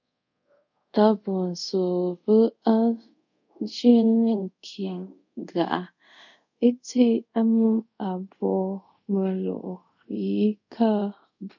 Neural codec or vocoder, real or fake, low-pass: codec, 24 kHz, 0.5 kbps, DualCodec; fake; 7.2 kHz